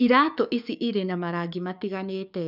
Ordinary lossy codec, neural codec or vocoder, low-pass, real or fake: none; codec, 44.1 kHz, 7.8 kbps, DAC; 5.4 kHz; fake